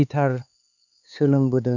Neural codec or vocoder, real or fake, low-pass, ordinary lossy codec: codec, 16 kHz, 4 kbps, X-Codec, HuBERT features, trained on LibriSpeech; fake; 7.2 kHz; none